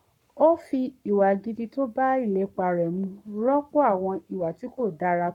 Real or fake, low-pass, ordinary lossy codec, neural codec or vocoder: fake; 19.8 kHz; none; codec, 44.1 kHz, 7.8 kbps, Pupu-Codec